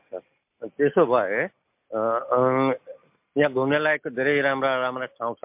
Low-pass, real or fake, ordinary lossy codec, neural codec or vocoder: 3.6 kHz; real; MP3, 32 kbps; none